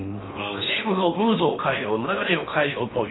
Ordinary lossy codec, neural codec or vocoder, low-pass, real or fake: AAC, 16 kbps; codec, 16 kHz in and 24 kHz out, 0.8 kbps, FocalCodec, streaming, 65536 codes; 7.2 kHz; fake